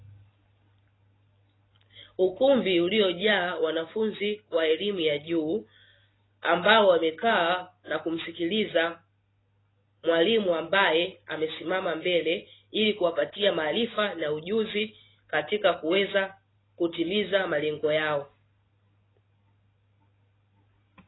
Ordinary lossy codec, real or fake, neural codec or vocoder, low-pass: AAC, 16 kbps; real; none; 7.2 kHz